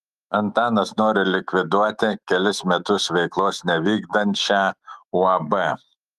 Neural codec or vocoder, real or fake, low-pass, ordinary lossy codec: autoencoder, 48 kHz, 128 numbers a frame, DAC-VAE, trained on Japanese speech; fake; 14.4 kHz; Opus, 32 kbps